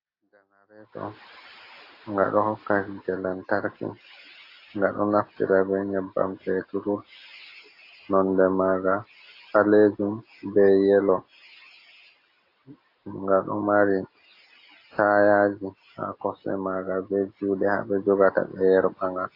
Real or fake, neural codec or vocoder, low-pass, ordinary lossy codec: real; none; 5.4 kHz; AAC, 32 kbps